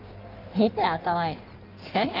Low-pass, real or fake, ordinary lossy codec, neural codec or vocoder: 5.4 kHz; fake; Opus, 24 kbps; codec, 16 kHz in and 24 kHz out, 1.1 kbps, FireRedTTS-2 codec